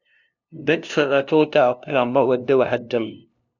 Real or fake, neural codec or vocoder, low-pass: fake; codec, 16 kHz, 0.5 kbps, FunCodec, trained on LibriTTS, 25 frames a second; 7.2 kHz